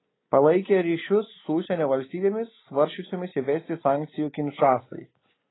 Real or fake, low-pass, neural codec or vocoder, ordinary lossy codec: real; 7.2 kHz; none; AAC, 16 kbps